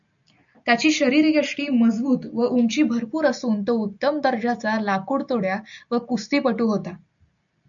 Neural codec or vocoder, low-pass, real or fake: none; 7.2 kHz; real